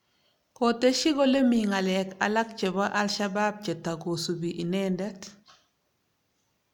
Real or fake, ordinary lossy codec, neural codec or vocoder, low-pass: real; none; none; 19.8 kHz